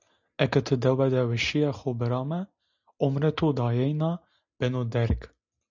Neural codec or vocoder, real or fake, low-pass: none; real; 7.2 kHz